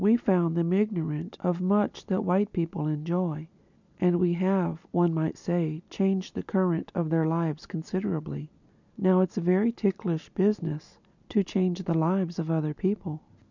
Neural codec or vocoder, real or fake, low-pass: none; real; 7.2 kHz